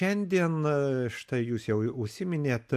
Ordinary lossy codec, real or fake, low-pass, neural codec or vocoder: AAC, 96 kbps; real; 14.4 kHz; none